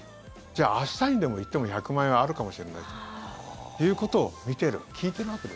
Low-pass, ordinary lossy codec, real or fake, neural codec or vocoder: none; none; real; none